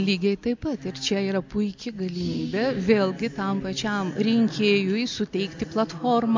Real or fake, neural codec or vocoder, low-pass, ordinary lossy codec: real; none; 7.2 kHz; MP3, 64 kbps